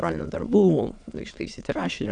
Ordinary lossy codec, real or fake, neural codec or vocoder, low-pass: AAC, 64 kbps; fake; autoencoder, 22.05 kHz, a latent of 192 numbers a frame, VITS, trained on many speakers; 9.9 kHz